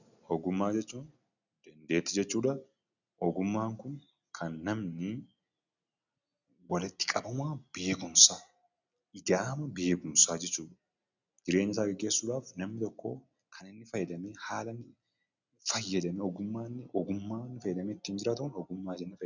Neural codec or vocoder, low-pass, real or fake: none; 7.2 kHz; real